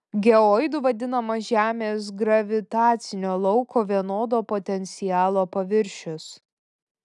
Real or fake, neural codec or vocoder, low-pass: real; none; 10.8 kHz